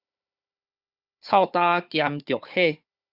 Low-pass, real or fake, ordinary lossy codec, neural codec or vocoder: 5.4 kHz; fake; Opus, 64 kbps; codec, 16 kHz, 4 kbps, FunCodec, trained on Chinese and English, 50 frames a second